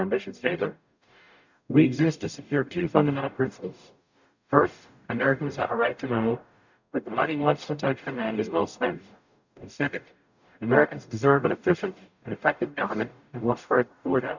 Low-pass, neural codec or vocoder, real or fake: 7.2 kHz; codec, 44.1 kHz, 0.9 kbps, DAC; fake